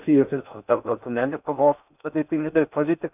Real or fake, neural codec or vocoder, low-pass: fake; codec, 16 kHz in and 24 kHz out, 0.6 kbps, FocalCodec, streaming, 4096 codes; 3.6 kHz